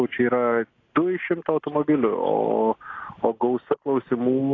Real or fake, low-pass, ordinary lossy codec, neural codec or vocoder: real; 7.2 kHz; AAC, 32 kbps; none